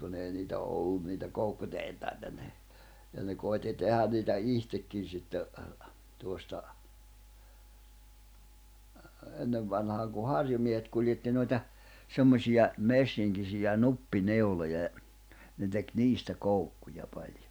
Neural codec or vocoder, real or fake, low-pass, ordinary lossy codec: none; real; none; none